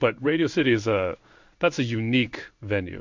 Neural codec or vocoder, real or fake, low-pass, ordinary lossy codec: codec, 16 kHz in and 24 kHz out, 1 kbps, XY-Tokenizer; fake; 7.2 kHz; MP3, 48 kbps